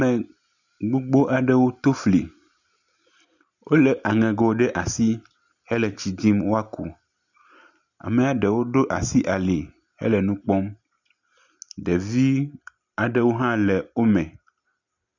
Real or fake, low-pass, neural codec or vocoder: real; 7.2 kHz; none